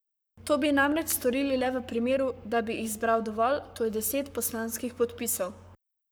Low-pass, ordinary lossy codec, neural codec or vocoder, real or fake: none; none; codec, 44.1 kHz, 7.8 kbps, Pupu-Codec; fake